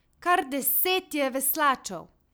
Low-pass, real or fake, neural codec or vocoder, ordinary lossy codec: none; real; none; none